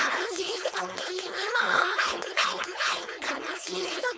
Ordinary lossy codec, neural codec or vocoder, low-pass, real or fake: none; codec, 16 kHz, 4.8 kbps, FACodec; none; fake